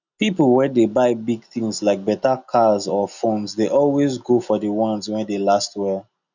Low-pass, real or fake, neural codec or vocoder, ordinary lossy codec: 7.2 kHz; real; none; none